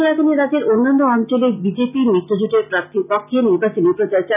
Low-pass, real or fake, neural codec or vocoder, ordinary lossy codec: 3.6 kHz; real; none; none